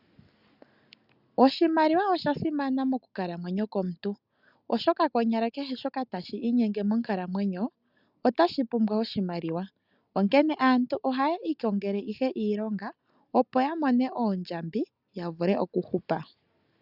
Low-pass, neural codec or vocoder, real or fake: 5.4 kHz; none; real